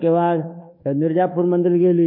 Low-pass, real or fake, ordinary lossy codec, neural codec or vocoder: 5.4 kHz; fake; MP3, 24 kbps; codec, 24 kHz, 1.2 kbps, DualCodec